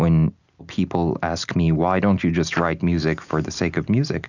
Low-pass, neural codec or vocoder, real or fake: 7.2 kHz; none; real